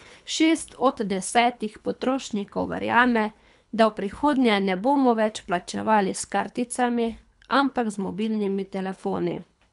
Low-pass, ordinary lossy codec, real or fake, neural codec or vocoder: 10.8 kHz; none; fake; codec, 24 kHz, 3 kbps, HILCodec